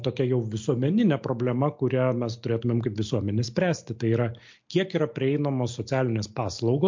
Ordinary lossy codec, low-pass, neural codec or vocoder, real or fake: MP3, 48 kbps; 7.2 kHz; none; real